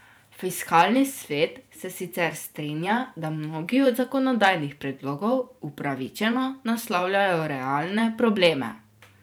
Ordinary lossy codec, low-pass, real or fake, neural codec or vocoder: none; none; fake; vocoder, 44.1 kHz, 128 mel bands every 512 samples, BigVGAN v2